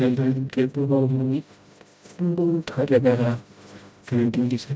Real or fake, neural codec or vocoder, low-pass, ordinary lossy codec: fake; codec, 16 kHz, 0.5 kbps, FreqCodec, smaller model; none; none